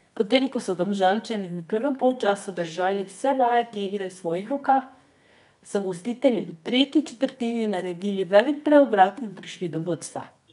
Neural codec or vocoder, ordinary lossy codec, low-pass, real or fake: codec, 24 kHz, 0.9 kbps, WavTokenizer, medium music audio release; none; 10.8 kHz; fake